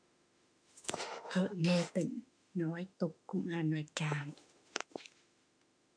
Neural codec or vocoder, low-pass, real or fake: autoencoder, 48 kHz, 32 numbers a frame, DAC-VAE, trained on Japanese speech; 9.9 kHz; fake